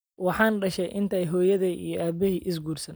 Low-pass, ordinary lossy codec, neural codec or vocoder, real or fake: none; none; none; real